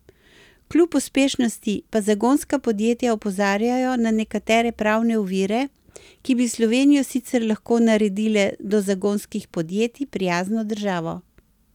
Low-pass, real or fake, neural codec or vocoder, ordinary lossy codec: 19.8 kHz; real; none; none